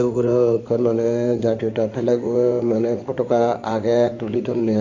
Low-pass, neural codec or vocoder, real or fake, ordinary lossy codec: 7.2 kHz; codec, 16 kHz in and 24 kHz out, 2.2 kbps, FireRedTTS-2 codec; fake; none